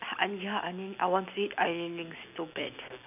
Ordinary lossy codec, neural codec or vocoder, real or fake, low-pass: none; none; real; 3.6 kHz